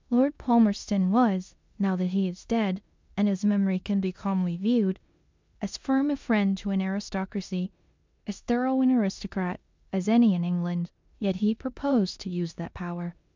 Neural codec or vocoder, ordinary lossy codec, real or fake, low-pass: codec, 16 kHz in and 24 kHz out, 0.9 kbps, LongCat-Audio-Codec, four codebook decoder; MP3, 64 kbps; fake; 7.2 kHz